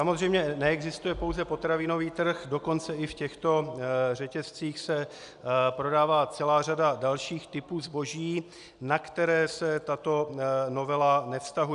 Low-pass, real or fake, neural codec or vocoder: 10.8 kHz; real; none